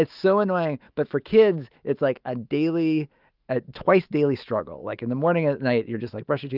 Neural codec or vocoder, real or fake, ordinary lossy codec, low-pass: autoencoder, 48 kHz, 128 numbers a frame, DAC-VAE, trained on Japanese speech; fake; Opus, 32 kbps; 5.4 kHz